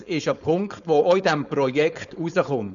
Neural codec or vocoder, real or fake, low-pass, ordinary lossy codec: codec, 16 kHz, 4.8 kbps, FACodec; fake; 7.2 kHz; none